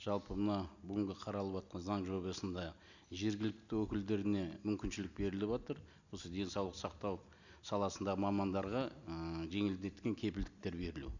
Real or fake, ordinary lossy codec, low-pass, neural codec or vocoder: real; none; 7.2 kHz; none